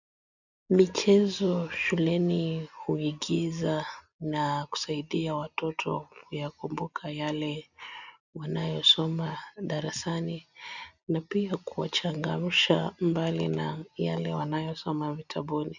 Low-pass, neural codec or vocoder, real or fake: 7.2 kHz; none; real